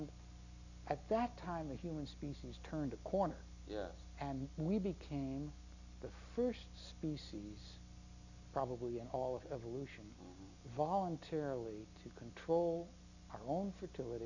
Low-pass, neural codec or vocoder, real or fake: 7.2 kHz; none; real